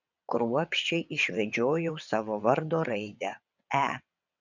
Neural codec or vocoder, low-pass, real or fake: vocoder, 22.05 kHz, 80 mel bands, WaveNeXt; 7.2 kHz; fake